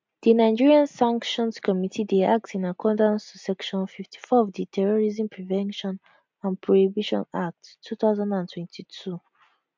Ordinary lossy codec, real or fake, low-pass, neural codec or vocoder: MP3, 64 kbps; real; 7.2 kHz; none